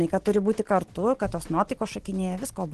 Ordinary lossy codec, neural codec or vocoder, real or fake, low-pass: Opus, 16 kbps; none; real; 10.8 kHz